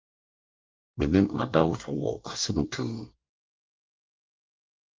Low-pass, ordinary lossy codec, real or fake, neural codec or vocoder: 7.2 kHz; Opus, 32 kbps; fake; codec, 24 kHz, 1 kbps, SNAC